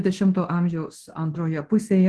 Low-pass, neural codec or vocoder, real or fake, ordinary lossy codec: 10.8 kHz; codec, 24 kHz, 0.9 kbps, DualCodec; fake; Opus, 16 kbps